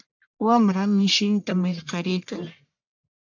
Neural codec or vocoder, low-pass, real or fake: codec, 44.1 kHz, 1.7 kbps, Pupu-Codec; 7.2 kHz; fake